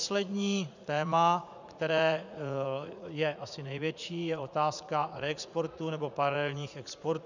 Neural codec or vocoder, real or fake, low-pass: vocoder, 44.1 kHz, 80 mel bands, Vocos; fake; 7.2 kHz